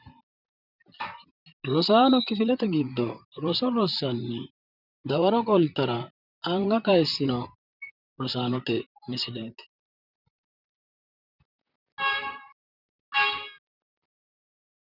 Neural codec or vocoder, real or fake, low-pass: vocoder, 44.1 kHz, 128 mel bands, Pupu-Vocoder; fake; 5.4 kHz